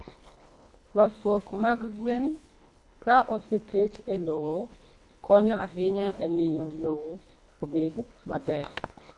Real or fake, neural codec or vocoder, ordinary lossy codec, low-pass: fake; codec, 24 kHz, 1.5 kbps, HILCodec; AAC, 64 kbps; 10.8 kHz